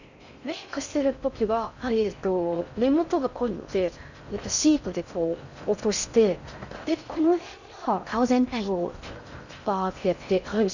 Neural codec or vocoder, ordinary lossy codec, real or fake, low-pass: codec, 16 kHz in and 24 kHz out, 0.6 kbps, FocalCodec, streaming, 4096 codes; none; fake; 7.2 kHz